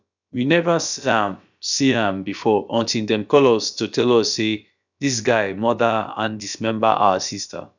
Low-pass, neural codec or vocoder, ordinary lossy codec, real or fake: 7.2 kHz; codec, 16 kHz, about 1 kbps, DyCAST, with the encoder's durations; none; fake